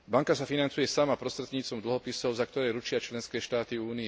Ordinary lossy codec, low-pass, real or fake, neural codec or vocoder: none; none; real; none